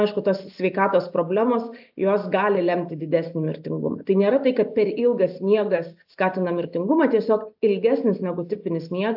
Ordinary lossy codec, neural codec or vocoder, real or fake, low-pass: AAC, 48 kbps; none; real; 5.4 kHz